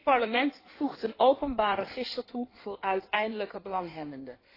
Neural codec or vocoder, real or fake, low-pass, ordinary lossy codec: codec, 16 kHz in and 24 kHz out, 1.1 kbps, FireRedTTS-2 codec; fake; 5.4 kHz; AAC, 24 kbps